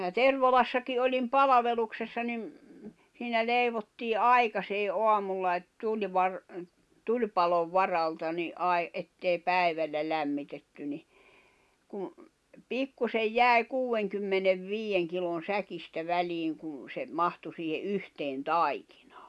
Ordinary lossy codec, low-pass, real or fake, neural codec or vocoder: none; none; real; none